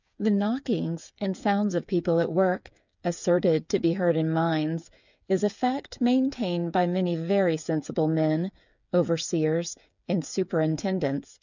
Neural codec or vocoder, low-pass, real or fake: codec, 16 kHz, 8 kbps, FreqCodec, smaller model; 7.2 kHz; fake